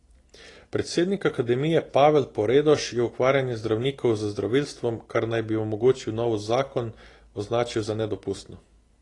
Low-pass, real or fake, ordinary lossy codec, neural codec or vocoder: 10.8 kHz; real; AAC, 32 kbps; none